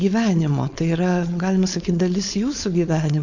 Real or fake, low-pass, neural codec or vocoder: fake; 7.2 kHz; codec, 16 kHz, 4.8 kbps, FACodec